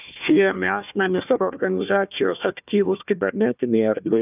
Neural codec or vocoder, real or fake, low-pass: codec, 16 kHz, 1 kbps, FunCodec, trained on Chinese and English, 50 frames a second; fake; 3.6 kHz